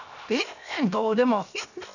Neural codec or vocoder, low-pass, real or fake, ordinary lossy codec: codec, 16 kHz, 0.7 kbps, FocalCodec; 7.2 kHz; fake; none